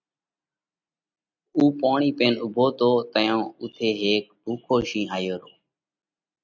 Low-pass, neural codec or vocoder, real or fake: 7.2 kHz; none; real